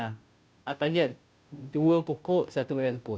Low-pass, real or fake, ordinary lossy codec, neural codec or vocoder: none; fake; none; codec, 16 kHz, 0.5 kbps, FunCodec, trained on Chinese and English, 25 frames a second